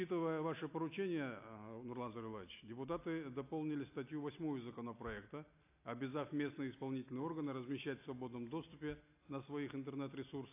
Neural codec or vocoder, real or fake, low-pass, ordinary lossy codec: none; real; 3.6 kHz; AAC, 24 kbps